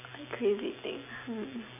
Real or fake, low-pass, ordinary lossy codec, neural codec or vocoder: real; 3.6 kHz; none; none